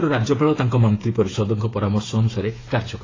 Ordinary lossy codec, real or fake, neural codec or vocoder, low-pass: AAC, 32 kbps; fake; codec, 16 kHz, 8 kbps, FreqCodec, smaller model; 7.2 kHz